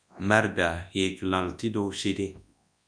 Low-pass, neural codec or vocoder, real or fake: 9.9 kHz; codec, 24 kHz, 0.9 kbps, WavTokenizer, large speech release; fake